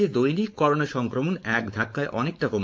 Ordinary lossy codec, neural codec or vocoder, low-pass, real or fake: none; codec, 16 kHz, 4.8 kbps, FACodec; none; fake